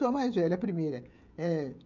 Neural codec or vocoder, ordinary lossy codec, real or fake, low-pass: codec, 16 kHz, 16 kbps, FreqCodec, smaller model; none; fake; 7.2 kHz